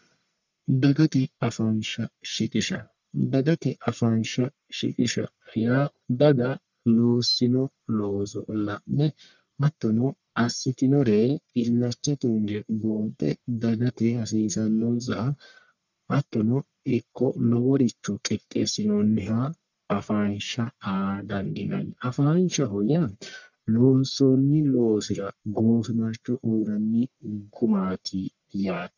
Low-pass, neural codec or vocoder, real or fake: 7.2 kHz; codec, 44.1 kHz, 1.7 kbps, Pupu-Codec; fake